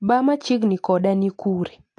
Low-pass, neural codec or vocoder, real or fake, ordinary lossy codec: 9.9 kHz; none; real; AAC, 48 kbps